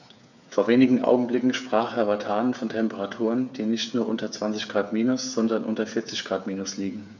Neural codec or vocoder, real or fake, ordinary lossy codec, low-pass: codec, 16 kHz, 8 kbps, FreqCodec, smaller model; fake; none; 7.2 kHz